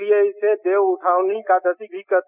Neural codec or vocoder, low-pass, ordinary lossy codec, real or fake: none; 3.6 kHz; none; real